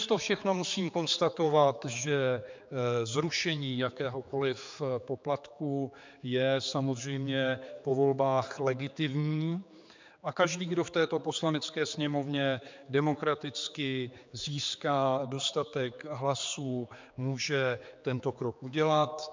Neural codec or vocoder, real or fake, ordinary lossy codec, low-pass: codec, 16 kHz, 4 kbps, X-Codec, HuBERT features, trained on general audio; fake; MP3, 64 kbps; 7.2 kHz